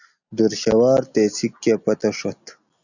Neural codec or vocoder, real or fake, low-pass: none; real; 7.2 kHz